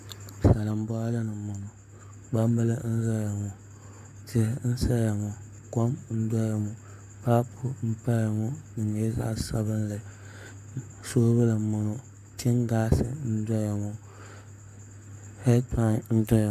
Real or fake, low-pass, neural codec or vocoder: fake; 14.4 kHz; codec, 44.1 kHz, 7.8 kbps, Pupu-Codec